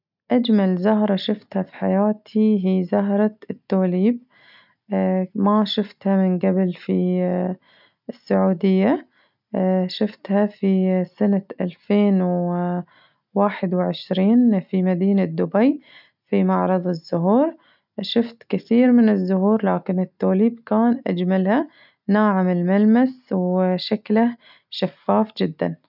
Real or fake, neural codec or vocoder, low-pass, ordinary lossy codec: real; none; 5.4 kHz; none